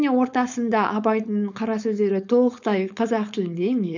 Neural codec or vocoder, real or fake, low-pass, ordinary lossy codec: codec, 16 kHz, 4.8 kbps, FACodec; fake; 7.2 kHz; none